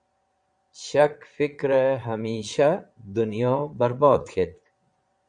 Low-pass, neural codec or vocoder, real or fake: 9.9 kHz; vocoder, 22.05 kHz, 80 mel bands, Vocos; fake